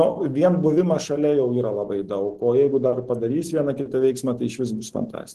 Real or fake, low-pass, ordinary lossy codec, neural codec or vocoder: real; 14.4 kHz; Opus, 16 kbps; none